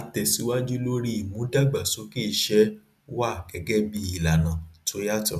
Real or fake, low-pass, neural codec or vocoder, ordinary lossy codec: real; 14.4 kHz; none; none